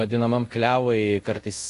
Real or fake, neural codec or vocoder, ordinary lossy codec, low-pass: fake; codec, 24 kHz, 0.5 kbps, DualCodec; AAC, 48 kbps; 10.8 kHz